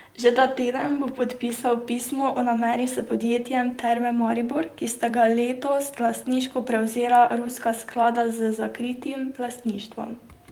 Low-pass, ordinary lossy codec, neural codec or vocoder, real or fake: 19.8 kHz; Opus, 32 kbps; vocoder, 44.1 kHz, 128 mel bands, Pupu-Vocoder; fake